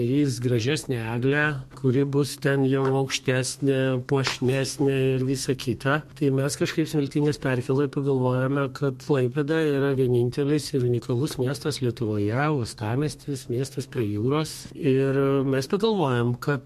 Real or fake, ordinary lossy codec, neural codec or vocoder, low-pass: fake; MP3, 64 kbps; codec, 32 kHz, 1.9 kbps, SNAC; 14.4 kHz